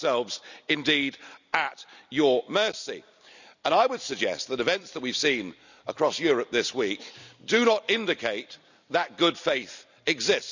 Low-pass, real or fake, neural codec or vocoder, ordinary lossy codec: 7.2 kHz; fake; vocoder, 44.1 kHz, 128 mel bands every 256 samples, BigVGAN v2; none